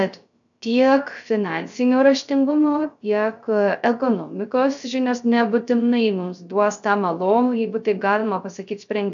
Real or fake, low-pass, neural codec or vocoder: fake; 7.2 kHz; codec, 16 kHz, 0.3 kbps, FocalCodec